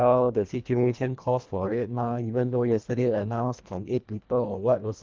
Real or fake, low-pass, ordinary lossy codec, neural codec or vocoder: fake; 7.2 kHz; Opus, 24 kbps; codec, 24 kHz, 1.5 kbps, HILCodec